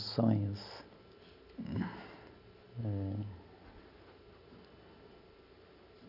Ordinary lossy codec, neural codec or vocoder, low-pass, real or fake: none; none; 5.4 kHz; real